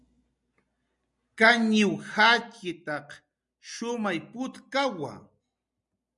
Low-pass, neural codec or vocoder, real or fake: 10.8 kHz; none; real